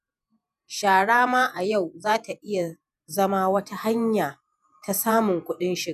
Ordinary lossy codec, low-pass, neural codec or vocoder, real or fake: none; 14.4 kHz; vocoder, 48 kHz, 128 mel bands, Vocos; fake